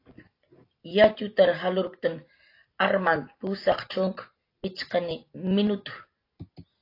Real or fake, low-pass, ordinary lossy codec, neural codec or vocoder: real; 5.4 kHz; AAC, 32 kbps; none